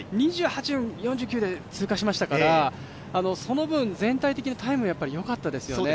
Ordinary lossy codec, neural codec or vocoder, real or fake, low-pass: none; none; real; none